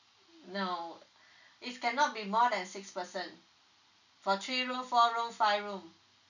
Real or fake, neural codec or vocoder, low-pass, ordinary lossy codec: real; none; 7.2 kHz; none